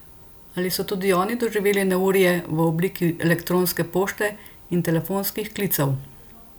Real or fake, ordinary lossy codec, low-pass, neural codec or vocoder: real; none; none; none